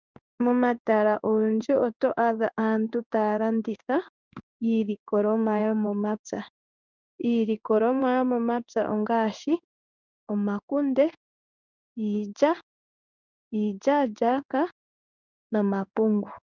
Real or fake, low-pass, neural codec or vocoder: fake; 7.2 kHz; codec, 16 kHz in and 24 kHz out, 1 kbps, XY-Tokenizer